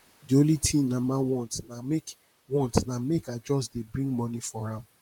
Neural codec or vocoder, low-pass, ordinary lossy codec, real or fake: vocoder, 44.1 kHz, 128 mel bands, Pupu-Vocoder; 19.8 kHz; none; fake